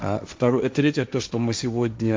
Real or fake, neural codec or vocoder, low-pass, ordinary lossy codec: fake; codec, 16 kHz, 1.1 kbps, Voila-Tokenizer; none; none